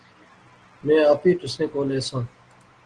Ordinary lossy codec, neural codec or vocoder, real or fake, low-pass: Opus, 16 kbps; none; real; 9.9 kHz